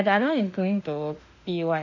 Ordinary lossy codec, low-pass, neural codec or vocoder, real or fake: none; 7.2 kHz; autoencoder, 48 kHz, 32 numbers a frame, DAC-VAE, trained on Japanese speech; fake